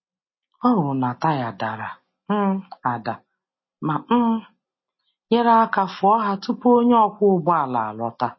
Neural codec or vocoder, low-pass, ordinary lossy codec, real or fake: none; 7.2 kHz; MP3, 24 kbps; real